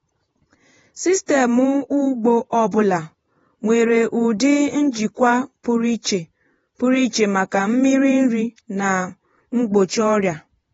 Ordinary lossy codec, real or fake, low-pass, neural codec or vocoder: AAC, 24 kbps; fake; 19.8 kHz; vocoder, 48 kHz, 128 mel bands, Vocos